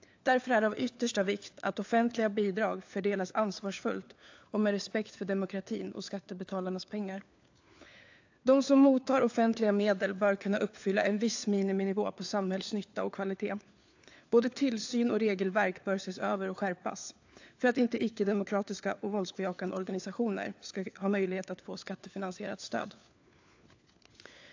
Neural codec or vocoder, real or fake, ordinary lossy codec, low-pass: codec, 16 kHz, 4 kbps, FunCodec, trained on LibriTTS, 50 frames a second; fake; AAC, 48 kbps; 7.2 kHz